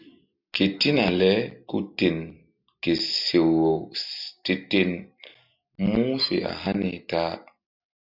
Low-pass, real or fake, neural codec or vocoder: 5.4 kHz; real; none